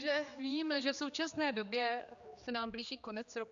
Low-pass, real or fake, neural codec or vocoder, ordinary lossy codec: 7.2 kHz; fake; codec, 16 kHz, 2 kbps, X-Codec, HuBERT features, trained on general audio; Opus, 64 kbps